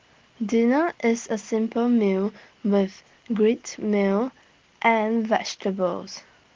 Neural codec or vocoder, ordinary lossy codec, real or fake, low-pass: none; Opus, 16 kbps; real; 7.2 kHz